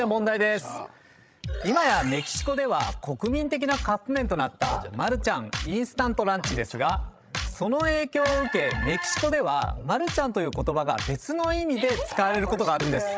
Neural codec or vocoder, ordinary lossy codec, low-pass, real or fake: codec, 16 kHz, 16 kbps, FreqCodec, larger model; none; none; fake